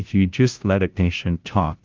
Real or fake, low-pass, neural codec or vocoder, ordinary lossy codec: fake; 7.2 kHz; codec, 16 kHz, 0.5 kbps, FunCodec, trained on Chinese and English, 25 frames a second; Opus, 32 kbps